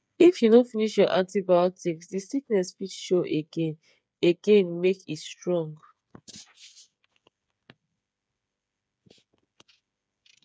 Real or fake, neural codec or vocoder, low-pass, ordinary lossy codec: fake; codec, 16 kHz, 8 kbps, FreqCodec, smaller model; none; none